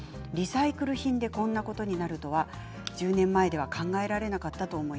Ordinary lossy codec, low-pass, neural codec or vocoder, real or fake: none; none; none; real